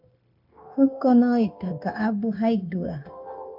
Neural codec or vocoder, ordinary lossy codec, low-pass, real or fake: codec, 16 kHz, 0.9 kbps, LongCat-Audio-Codec; MP3, 48 kbps; 5.4 kHz; fake